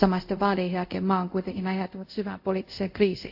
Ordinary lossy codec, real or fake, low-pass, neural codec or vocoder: AAC, 48 kbps; fake; 5.4 kHz; codec, 24 kHz, 0.5 kbps, DualCodec